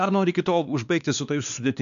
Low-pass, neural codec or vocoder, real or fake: 7.2 kHz; codec, 16 kHz, 2 kbps, X-Codec, WavLM features, trained on Multilingual LibriSpeech; fake